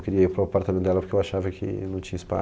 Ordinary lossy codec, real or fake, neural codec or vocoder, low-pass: none; real; none; none